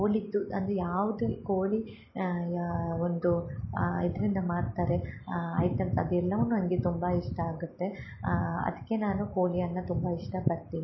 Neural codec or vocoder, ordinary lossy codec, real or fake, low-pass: none; MP3, 24 kbps; real; 7.2 kHz